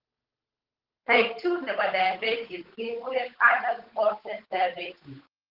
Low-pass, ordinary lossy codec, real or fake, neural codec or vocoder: 5.4 kHz; Opus, 16 kbps; fake; codec, 16 kHz, 8 kbps, FunCodec, trained on Chinese and English, 25 frames a second